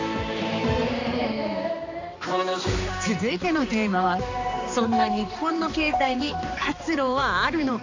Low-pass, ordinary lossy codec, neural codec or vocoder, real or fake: 7.2 kHz; AAC, 48 kbps; codec, 16 kHz, 2 kbps, X-Codec, HuBERT features, trained on balanced general audio; fake